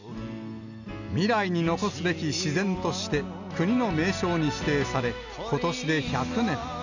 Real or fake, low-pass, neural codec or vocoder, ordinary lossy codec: real; 7.2 kHz; none; none